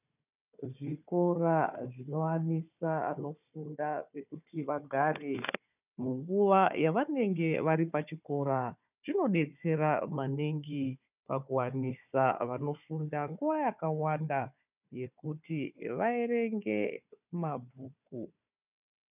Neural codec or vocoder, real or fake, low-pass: codec, 16 kHz, 4 kbps, FunCodec, trained on Chinese and English, 50 frames a second; fake; 3.6 kHz